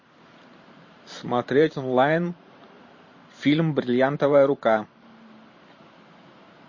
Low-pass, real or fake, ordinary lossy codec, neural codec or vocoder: 7.2 kHz; fake; MP3, 32 kbps; vocoder, 44.1 kHz, 128 mel bands every 512 samples, BigVGAN v2